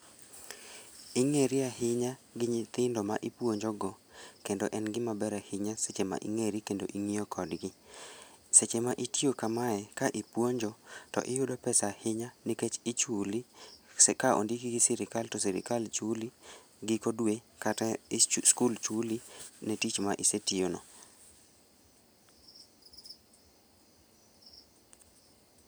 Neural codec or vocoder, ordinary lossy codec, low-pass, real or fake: none; none; none; real